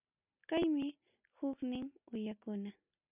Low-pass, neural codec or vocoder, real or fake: 3.6 kHz; none; real